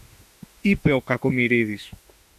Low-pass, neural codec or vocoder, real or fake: 14.4 kHz; autoencoder, 48 kHz, 32 numbers a frame, DAC-VAE, trained on Japanese speech; fake